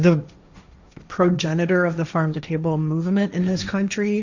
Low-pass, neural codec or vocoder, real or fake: 7.2 kHz; codec, 16 kHz, 1.1 kbps, Voila-Tokenizer; fake